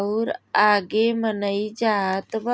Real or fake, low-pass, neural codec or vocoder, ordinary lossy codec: real; none; none; none